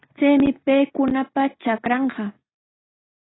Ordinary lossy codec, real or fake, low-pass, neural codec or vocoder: AAC, 16 kbps; real; 7.2 kHz; none